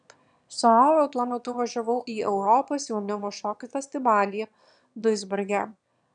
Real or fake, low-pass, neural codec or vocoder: fake; 9.9 kHz; autoencoder, 22.05 kHz, a latent of 192 numbers a frame, VITS, trained on one speaker